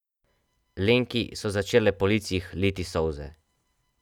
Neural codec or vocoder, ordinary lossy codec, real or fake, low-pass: none; none; real; 19.8 kHz